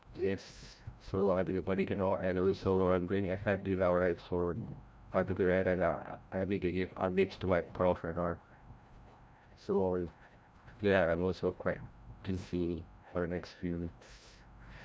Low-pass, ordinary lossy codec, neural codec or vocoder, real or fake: none; none; codec, 16 kHz, 0.5 kbps, FreqCodec, larger model; fake